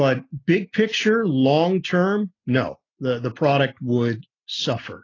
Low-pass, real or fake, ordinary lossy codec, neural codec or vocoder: 7.2 kHz; real; AAC, 32 kbps; none